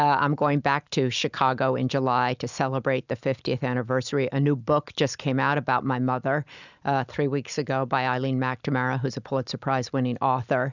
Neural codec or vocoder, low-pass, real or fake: none; 7.2 kHz; real